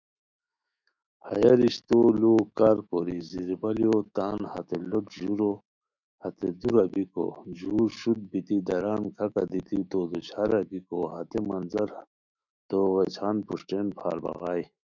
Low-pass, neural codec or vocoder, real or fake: 7.2 kHz; autoencoder, 48 kHz, 128 numbers a frame, DAC-VAE, trained on Japanese speech; fake